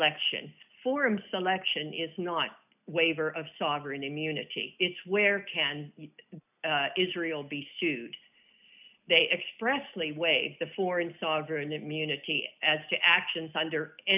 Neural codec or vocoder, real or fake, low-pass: none; real; 3.6 kHz